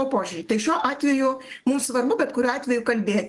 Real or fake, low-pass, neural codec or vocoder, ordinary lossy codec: fake; 10.8 kHz; codec, 44.1 kHz, 7.8 kbps, DAC; Opus, 24 kbps